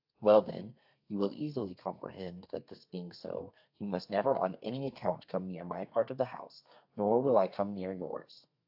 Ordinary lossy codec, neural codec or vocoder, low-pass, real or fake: AAC, 48 kbps; codec, 32 kHz, 1.9 kbps, SNAC; 5.4 kHz; fake